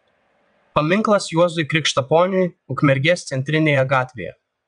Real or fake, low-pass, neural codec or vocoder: fake; 9.9 kHz; vocoder, 22.05 kHz, 80 mel bands, WaveNeXt